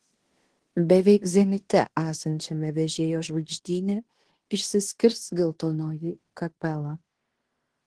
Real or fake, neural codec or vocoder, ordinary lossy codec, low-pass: fake; codec, 16 kHz in and 24 kHz out, 0.9 kbps, LongCat-Audio-Codec, fine tuned four codebook decoder; Opus, 16 kbps; 10.8 kHz